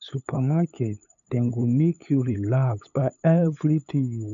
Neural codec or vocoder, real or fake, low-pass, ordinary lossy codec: codec, 16 kHz, 8 kbps, FunCodec, trained on LibriTTS, 25 frames a second; fake; 7.2 kHz; none